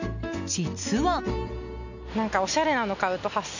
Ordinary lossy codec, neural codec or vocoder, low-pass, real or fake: none; none; 7.2 kHz; real